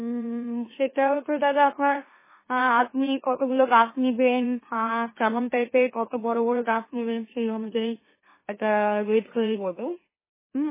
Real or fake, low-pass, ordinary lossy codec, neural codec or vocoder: fake; 3.6 kHz; MP3, 16 kbps; autoencoder, 44.1 kHz, a latent of 192 numbers a frame, MeloTTS